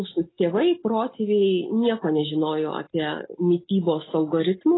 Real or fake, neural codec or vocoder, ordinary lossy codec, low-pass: real; none; AAC, 16 kbps; 7.2 kHz